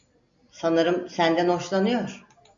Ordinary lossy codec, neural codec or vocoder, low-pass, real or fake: AAC, 64 kbps; none; 7.2 kHz; real